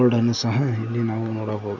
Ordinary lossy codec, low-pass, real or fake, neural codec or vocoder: none; 7.2 kHz; real; none